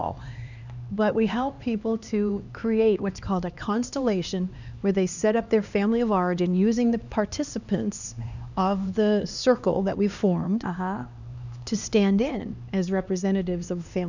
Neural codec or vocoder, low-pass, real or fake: codec, 16 kHz, 2 kbps, X-Codec, HuBERT features, trained on LibriSpeech; 7.2 kHz; fake